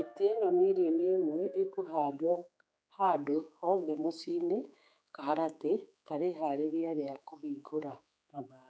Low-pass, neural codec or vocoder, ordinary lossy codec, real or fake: none; codec, 16 kHz, 4 kbps, X-Codec, HuBERT features, trained on general audio; none; fake